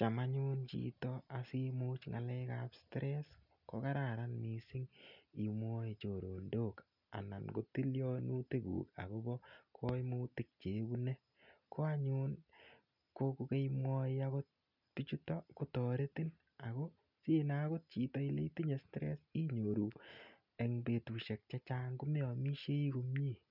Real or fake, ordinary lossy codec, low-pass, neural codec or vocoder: real; none; 5.4 kHz; none